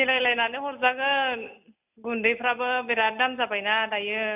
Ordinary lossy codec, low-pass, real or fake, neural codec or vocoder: none; 3.6 kHz; real; none